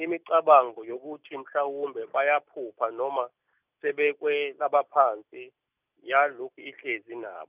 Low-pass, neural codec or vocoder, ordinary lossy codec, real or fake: 3.6 kHz; none; none; real